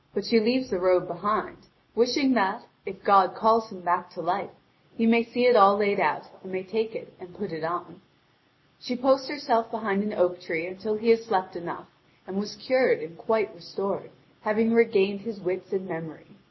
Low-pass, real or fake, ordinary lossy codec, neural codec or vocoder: 7.2 kHz; real; MP3, 24 kbps; none